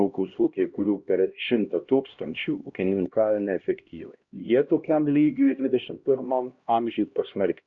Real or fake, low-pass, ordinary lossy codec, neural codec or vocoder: fake; 7.2 kHz; Opus, 64 kbps; codec, 16 kHz, 1 kbps, X-Codec, HuBERT features, trained on LibriSpeech